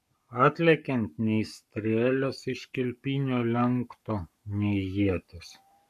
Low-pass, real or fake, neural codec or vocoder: 14.4 kHz; fake; codec, 44.1 kHz, 7.8 kbps, Pupu-Codec